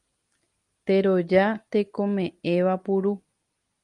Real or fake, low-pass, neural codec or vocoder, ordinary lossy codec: real; 10.8 kHz; none; Opus, 32 kbps